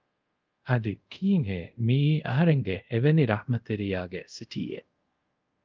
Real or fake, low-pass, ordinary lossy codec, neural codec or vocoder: fake; 7.2 kHz; Opus, 24 kbps; codec, 24 kHz, 0.5 kbps, DualCodec